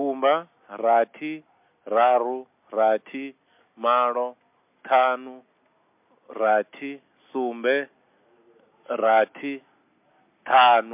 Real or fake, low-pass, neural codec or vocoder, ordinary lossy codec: real; 3.6 kHz; none; MP3, 32 kbps